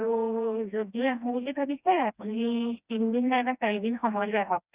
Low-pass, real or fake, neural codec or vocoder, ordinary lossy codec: 3.6 kHz; fake; codec, 16 kHz, 1 kbps, FreqCodec, smaller model; Opus, 64 kbps